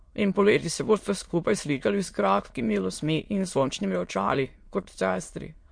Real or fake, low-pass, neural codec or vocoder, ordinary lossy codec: fake; 9.9 kHz; autoencoder, 22.05 kHz, a latent of 192 numbers a frame, VITS, trained on many speakers; MP3, 48 kbps